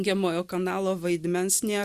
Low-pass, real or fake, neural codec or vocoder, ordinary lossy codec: 14.4 kHz; real; none; Opus, 64 kbps